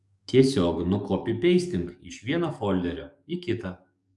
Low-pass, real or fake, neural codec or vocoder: 10.8 kHz; fake; codec, 44.1 kHz, 7.8 kbps, DAC